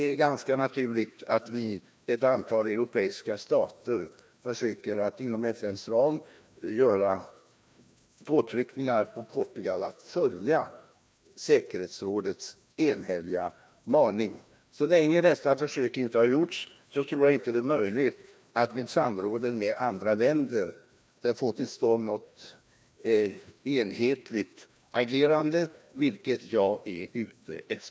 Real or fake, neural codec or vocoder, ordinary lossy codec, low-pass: fake; codec, 16 kHz, 1 kbps, FreqCodec, larger model; none; none